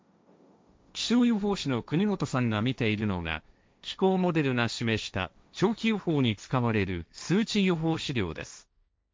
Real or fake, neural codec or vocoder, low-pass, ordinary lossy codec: fake; codec, 16 kHz, 1.1 kbps, Voila-Tokenizer; none; none